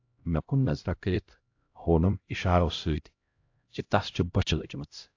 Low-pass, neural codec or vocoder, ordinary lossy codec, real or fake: 7.2 kHz; codec, 16 kHz, 0.5 kbps, X-Codec, HuBERT features, trained on LibriSpeech; AAC, 48 kbps; fake